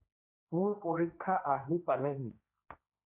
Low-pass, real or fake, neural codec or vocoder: 3.6 kHz; fake; codec, 16 kHz, 1.1 kbps, Voila-Tokenizer